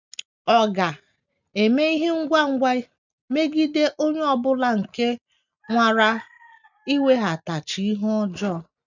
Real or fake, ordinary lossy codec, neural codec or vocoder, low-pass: real; none; none; 7.2 kHz